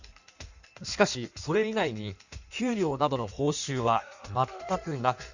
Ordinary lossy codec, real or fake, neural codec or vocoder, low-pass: none; fake; codec, 16 kHz in and 24 kHz out, 1.1 kbps, FireRedTTS-2 codec; 7.2 kHz